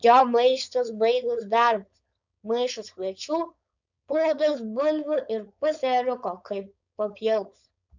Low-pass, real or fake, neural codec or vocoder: 7.2 kHz; fake; codec, 16 kHz, 4.8 kbps, FACodec